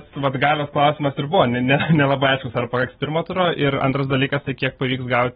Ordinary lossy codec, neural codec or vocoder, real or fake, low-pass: AAC, 16 kbps; none; real; 7.2 kHz